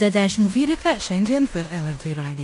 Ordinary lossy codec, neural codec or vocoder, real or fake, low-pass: AAC, 48 kbps; codec, 16 kHz in and 24 kHz out, 0.9 kbps, LongCat-Audio-Codec, fine tuned four codebook decoder; fake; 10.8 kHz